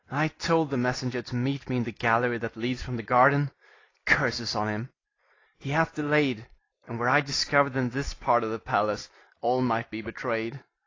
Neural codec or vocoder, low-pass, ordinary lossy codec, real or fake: none; 7.2 kHz; AAC, 32 kbps; real